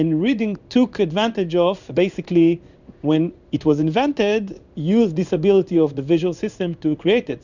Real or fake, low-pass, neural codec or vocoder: fake; 7.2 kHz; codec, 16 kHz in and 24 kHz out, 1 kbps, XY-Tokenizer